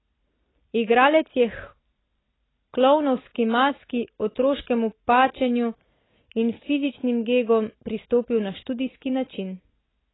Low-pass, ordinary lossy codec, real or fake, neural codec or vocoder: 7.2 kHz; AAC, 16 kbps; real; none